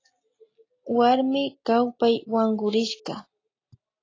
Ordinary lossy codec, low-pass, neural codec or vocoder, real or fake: AAC, 32 kbps; 7.2 kHz; none; real